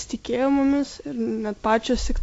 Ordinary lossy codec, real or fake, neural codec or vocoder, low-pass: AAC, 48 kbps; real; none; 10.8 kHz